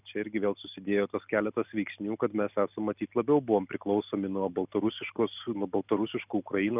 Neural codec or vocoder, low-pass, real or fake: none; 3.6 kHz; real